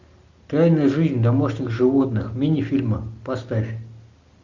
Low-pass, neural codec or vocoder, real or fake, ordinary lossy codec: 7.2 kHz; none; real; MP3, 64 kbps